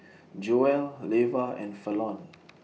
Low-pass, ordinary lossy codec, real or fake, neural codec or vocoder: none; none; real; none